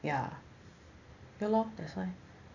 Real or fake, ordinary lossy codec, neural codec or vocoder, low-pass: real; none; none; 7.2 kHz